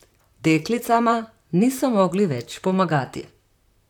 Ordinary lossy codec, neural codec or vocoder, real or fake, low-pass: none; vocoder, 44.1 kHz, 128 mel bands, Pupu-Vocoder; fake; 19.8 kHz